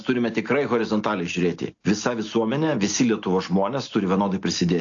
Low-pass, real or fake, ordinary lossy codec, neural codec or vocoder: 7.2 kHz; real; AAC, 48 kbps; none